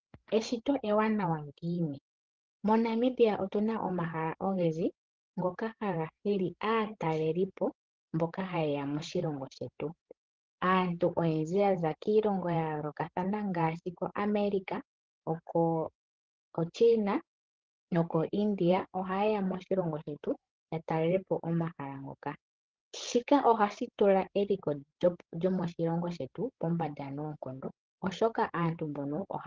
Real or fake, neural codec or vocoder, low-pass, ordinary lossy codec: fake; codec, 16 kHz, 16 kbps, FreqCodec, larger model; 7.2 kHz; Opus, 16 kbps